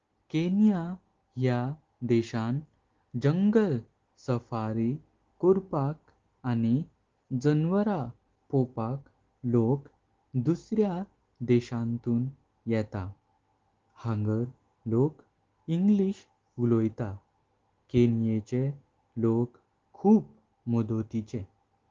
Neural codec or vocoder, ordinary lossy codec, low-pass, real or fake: none; Opus, 16 kbps; 7.2 kHz; real